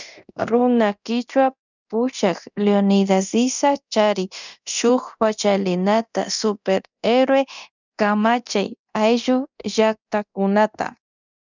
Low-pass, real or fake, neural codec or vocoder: 7.2 kHz; fake; codec, 24 kHz, 0.9 kbps, DualCodec